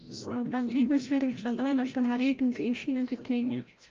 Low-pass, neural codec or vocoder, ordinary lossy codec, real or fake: 7.2 kHz; codec, 16 kHz, 0.5 kbps, FreqCodec, larger model; Opus, 24 kbps; fake